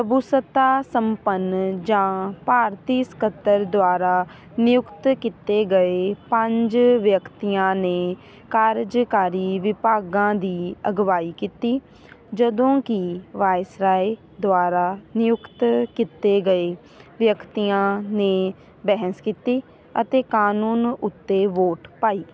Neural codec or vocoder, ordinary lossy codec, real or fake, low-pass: none; none; real; none